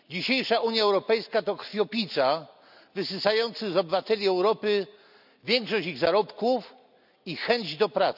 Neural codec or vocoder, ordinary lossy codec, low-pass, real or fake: none; none; 5.4 kHz; real